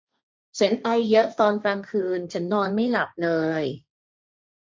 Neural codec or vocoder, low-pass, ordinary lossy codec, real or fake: codec, 16 kHz, 1.1 kbps, Voila-Tokenizer; none; none; fake